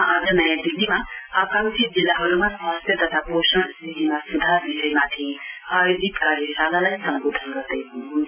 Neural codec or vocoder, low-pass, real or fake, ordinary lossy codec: none; 3.6 kHz; real; none